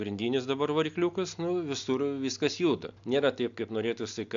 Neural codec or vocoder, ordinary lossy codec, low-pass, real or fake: none; AAC, 64 kbps; 7.2 kHz; real